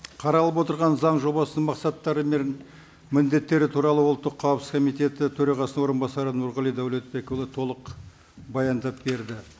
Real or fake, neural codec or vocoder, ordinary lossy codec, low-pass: real; none; none; none